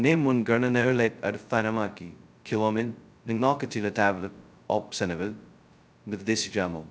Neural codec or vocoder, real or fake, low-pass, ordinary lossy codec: codec, 16 kHz, 0.2 kbps, FocalCodec; fake; none; none